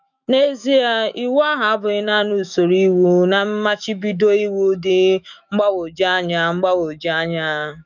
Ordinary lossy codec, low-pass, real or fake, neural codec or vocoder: none; 7.2 kHz; fake; autoencoder, 48 kHz, 128 numbers a frame, DAC-VAE, trained on Japanese speech